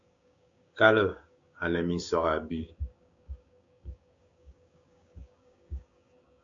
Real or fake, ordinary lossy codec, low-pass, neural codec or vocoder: fake; AAC, 48 kbps; 7.2 kHz; codec, 16 kHz, 6 kbps, DAC